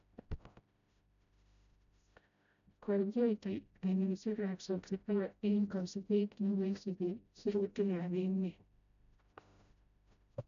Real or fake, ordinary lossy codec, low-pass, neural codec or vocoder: fake; none; 7.2 kHz; codec, 16 kHz, 0.5 kbps, FreqCodec, smaller model